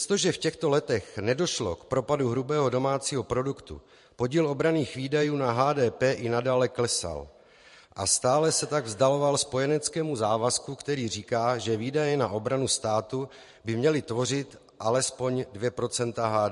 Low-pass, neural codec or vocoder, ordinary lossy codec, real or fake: 14.4 kHz; vocoder, 44.1 kHz, 128 mel bands every 512 samples, BigVGAN v2; MP3, 48 kbps; fake